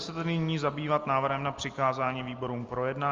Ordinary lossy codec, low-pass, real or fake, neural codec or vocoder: Opus, 32 kbps; 7.2 kHz; real; none